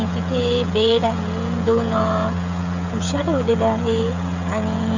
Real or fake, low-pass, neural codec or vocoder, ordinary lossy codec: fake; 7.2 kHz; codec, 16 kHz, 16 kbps, FreqCodec, smaller model; none